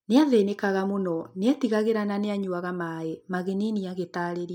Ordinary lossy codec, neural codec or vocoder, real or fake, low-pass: MP3, 96 kbps; none; real; 19.8 kHz